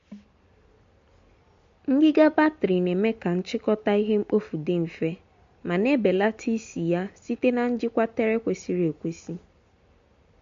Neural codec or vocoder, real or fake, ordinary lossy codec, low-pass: none; real; MP3, 48 kbps; 7.2 kHz